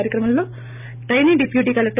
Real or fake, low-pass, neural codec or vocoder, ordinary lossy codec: real; 3.6 kHz; none; none